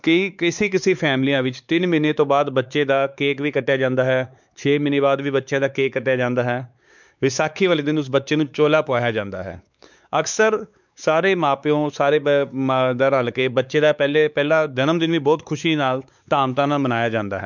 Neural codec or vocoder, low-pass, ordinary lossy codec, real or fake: codec, 16 kHz, 4 kbps, X-Codec, WavLM features, trained on Multilingual LibriSpeech; 7.2 kHz; none; fake